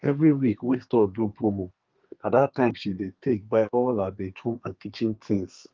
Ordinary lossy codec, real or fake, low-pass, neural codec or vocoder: Opus, 24 kbps; fake; 7.2 kHz; codec, 32 kHz, 1.9 kbps, SNAC